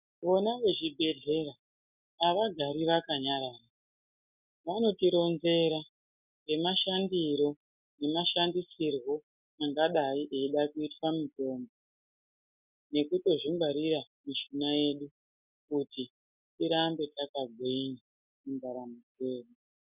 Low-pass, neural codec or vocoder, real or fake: 3.6 kHz; none; real